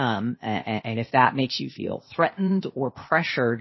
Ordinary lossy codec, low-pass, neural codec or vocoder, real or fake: MP3, 24 kbps; 7.2 kHz; codec, 16 kHz, 0.8 kbps, ZipCodec; fake